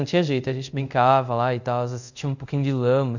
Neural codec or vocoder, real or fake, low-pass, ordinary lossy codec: codec, 24 kHz, 0.5 kbps, DualCodec; fake; 7.2 kHz; none